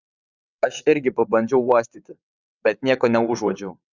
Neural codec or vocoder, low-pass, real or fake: vocoder, 22.05 kHz, 80 mel bands, WaveNeXt; 7.2 kHz; fake